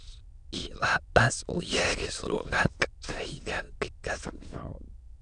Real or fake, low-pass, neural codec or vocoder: fake; 9.9 kHz; autoencoder, 22.05 kHz, a latent of 192 numbers a frame, VITS, trained on many speakers